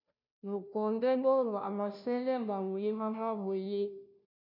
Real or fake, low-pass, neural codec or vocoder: fake; 5.4 kHz; codec, 16 kHz, 0.5 kbps, FunCodec, trained on Chinese and English, 25 frames a second